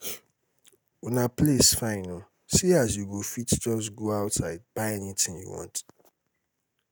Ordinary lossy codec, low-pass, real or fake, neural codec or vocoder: none; none; real; none